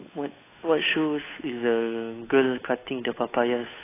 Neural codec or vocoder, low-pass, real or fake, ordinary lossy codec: codec, 16 kHz, 8 kbps, FunCodec, trained on Chinese and English, 25 frames a second; 3.6 kHz; fake; AAC, 16 kbps